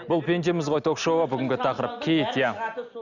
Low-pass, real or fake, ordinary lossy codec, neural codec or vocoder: 7.2 kHz; real; none; none